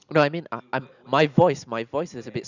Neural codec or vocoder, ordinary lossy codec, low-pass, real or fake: none; none; 7.2 kHz; real